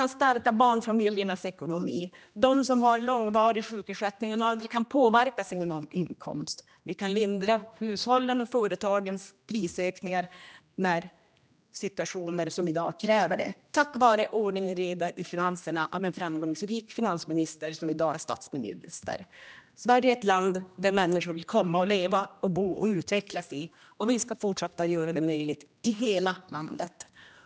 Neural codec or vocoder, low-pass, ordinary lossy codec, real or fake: codec, 16 kHz, 1 kbps, X-Codec, HuBERT features, trained on general audio; none; none; fake